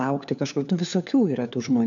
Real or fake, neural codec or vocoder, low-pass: fake; codec, 16 kHz, 4 kbps, FunCodec, trained on LibriTTS, 50 frames a second; 7.2 kHz